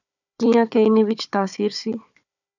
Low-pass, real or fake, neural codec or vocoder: 7.2 kHz; fake; codec, 16 kHz, 4 kbps, FunCodec, trained on Chinese and English, 50 frames a second